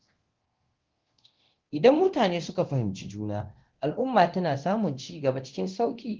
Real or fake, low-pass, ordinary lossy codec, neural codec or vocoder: fake; 7.2 kHz; Opus, 16 kbps; codec, 24 kHz, 0.9 kbps, DualCodec